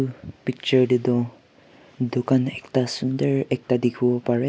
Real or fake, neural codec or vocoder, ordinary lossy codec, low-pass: real; none; none; none